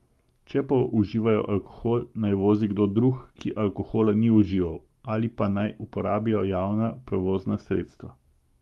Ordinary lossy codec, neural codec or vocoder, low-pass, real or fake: Opus, 32 kbps; codec, 44.1 kHz, 7.8 kbps, Pupu-Codec; 19.8 kHz; fake